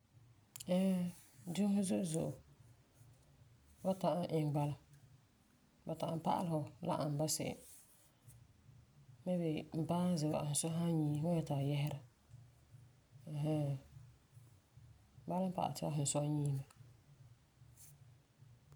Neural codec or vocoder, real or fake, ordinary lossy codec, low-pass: none; real; none; none